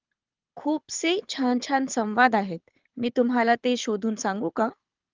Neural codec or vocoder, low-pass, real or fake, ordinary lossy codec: codec, 24 kHz, 6 kbps, HILCodec; 7.2 kHz; fake; Opus, 24 kbps